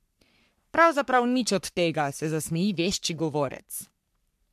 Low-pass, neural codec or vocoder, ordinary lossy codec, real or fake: 14.4 kHz; codec, 44.1 kHz, 3.4 kbps, Pupu-Codec; MP3, 96 kbps; fake